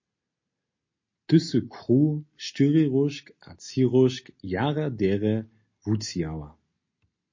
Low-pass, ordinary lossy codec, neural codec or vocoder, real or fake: 7.2 kHz; MP3, 32 kbps; none; real